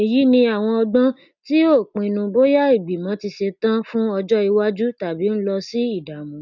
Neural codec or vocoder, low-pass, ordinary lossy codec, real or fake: none; 7.2 kHz; none; real